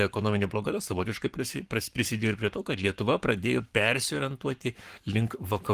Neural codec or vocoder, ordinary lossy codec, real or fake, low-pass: codec, 44.1 kHz, 7.8 kbps, Pupu-Codec; Opus, 24 kbps; fake; 14.4 kHz